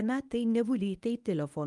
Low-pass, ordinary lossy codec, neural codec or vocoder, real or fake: 10.8 kHz; Opus, 32 kbps; codec, 24 kHz, 0.9 kbps, WavTokenizer, medium speech release version 1; fake